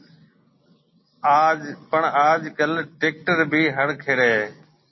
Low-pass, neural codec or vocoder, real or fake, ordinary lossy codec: 7.2 kHz; none; real; MP3, 24 kbps